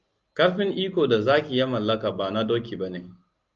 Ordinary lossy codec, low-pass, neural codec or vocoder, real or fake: Opus, 24 kbps; 7.2 kHz; none; real